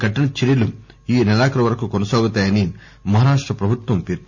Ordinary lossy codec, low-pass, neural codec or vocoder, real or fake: none; 7.2 kHz; none; real